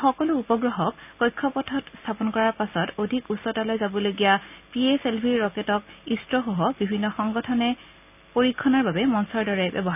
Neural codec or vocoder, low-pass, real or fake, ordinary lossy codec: none; 3.6 kHz; real; none